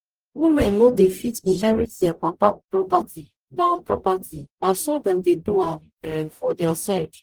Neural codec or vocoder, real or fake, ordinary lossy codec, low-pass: codec, 44.1 kHz, 0.9 kbps, DAC; fake; Opus, 24 kbps; 19.8 kHz